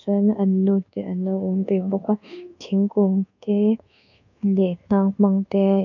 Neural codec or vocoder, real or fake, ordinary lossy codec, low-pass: codec, 24 kHz, 1.2 kbps, DualCodec; fake; none; 7.2 kHz